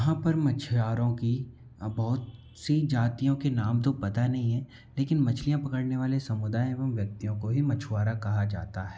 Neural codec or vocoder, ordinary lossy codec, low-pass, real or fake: none; none; none; real